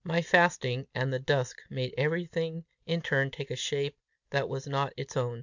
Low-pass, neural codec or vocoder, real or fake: 7.2 kHz; none; real